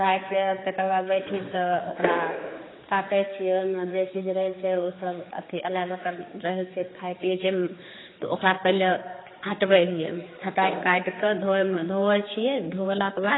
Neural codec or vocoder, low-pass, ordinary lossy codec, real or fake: codec, 16 kHz, 4 kbps, X-Codec, HuBERT features, trained on general audio; 7.2 kHz; AAC, 16 kbps; fake